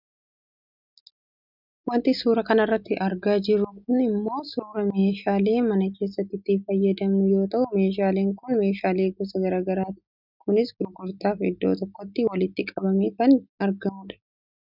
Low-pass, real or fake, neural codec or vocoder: 5.4 kHz; real; none